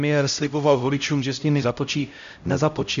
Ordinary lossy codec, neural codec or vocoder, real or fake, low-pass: MP3, 64 kbps; codec, 16 kHz, 0.5 kbps, X-Codec, HuBERT features, trained on LibriSpeech; fake; 7.2 kHz